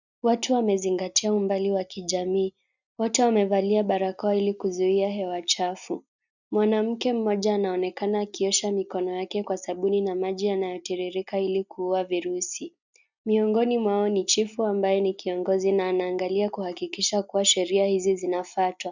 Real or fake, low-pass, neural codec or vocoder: real; 7.2 kHz; none